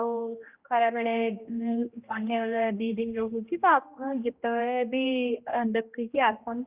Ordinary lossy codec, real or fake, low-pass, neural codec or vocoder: Opus, 32 kbps; fake; 3.6 kHz; codec, 16 kHz, 1 kbps, X-Codec, HuBERT features, trained on general audio